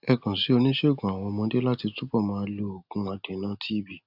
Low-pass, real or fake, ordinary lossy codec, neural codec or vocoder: 5.4 kHz; real; none; none